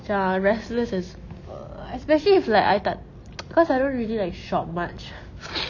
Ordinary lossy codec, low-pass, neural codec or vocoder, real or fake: none; 7.2 kHz; none; real